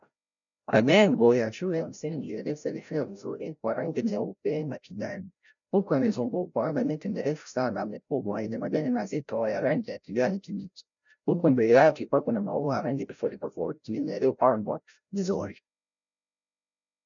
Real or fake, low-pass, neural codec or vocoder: fake; 7.2 kHz; codec, 16 kHz, 0.5 kbps, FreqCodec, larger model